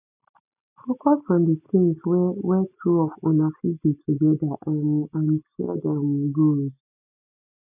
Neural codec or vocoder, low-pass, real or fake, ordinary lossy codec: none; 3.6 kHz; real; none